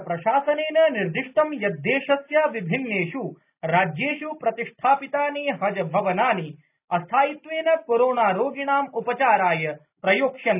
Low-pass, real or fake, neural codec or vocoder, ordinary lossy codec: 3.6 kHz; real; none; none